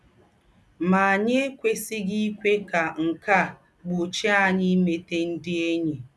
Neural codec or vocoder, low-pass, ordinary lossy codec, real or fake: none; none; none; real